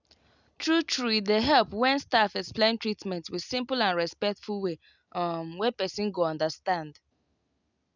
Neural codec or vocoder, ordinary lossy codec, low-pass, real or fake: none; none; 7.2 kHz; real